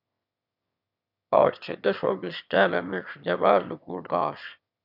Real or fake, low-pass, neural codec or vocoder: fake; 5.4 kHz; autoencoder, 22.05 kHz, a latent of 192 numbers a frame, VITS, trained on one speaker